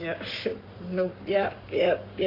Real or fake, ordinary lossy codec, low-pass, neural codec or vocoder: fake; MP3, 48 kbps; 5.4 kHz; codec, 44.1 kHz, 7.8 kbps, Pupu-Codec